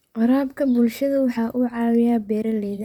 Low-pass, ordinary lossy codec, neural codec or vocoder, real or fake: 19.8 kHz; none; vocoder, 44.1 kHz, 128 mel bands, Pupu-Vocoder; fake